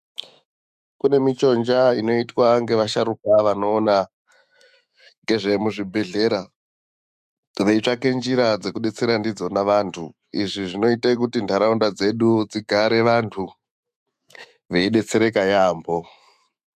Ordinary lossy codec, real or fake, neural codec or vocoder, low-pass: MP3, 96 kbps; fake; autoencoder, 48 kHz, 128 numbers a frame, DAC-VAE, trained on Japanese speech; 14.4 kHz